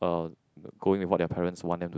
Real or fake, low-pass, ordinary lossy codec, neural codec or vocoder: real; none; none; none